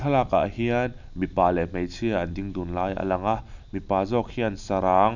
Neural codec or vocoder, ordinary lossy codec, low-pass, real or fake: none; none; 7.2 kHz; real